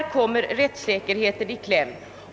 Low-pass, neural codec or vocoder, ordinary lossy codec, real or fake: none; none; none; real